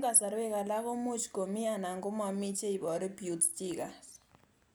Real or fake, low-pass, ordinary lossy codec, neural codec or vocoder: real; none; none; none